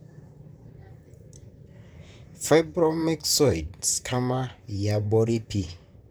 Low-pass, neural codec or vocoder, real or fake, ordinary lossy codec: none; vocoder, 44.1 kHz, 128 mel bands, Pupu-Vocoder; fake; none